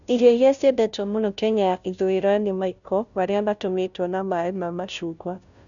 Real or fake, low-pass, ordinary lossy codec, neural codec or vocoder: fake; 7.2 kHz; none; codec, 16 kHz, 0.5 kbps, FunCodec, trained on Chinese and English, 25 frames a second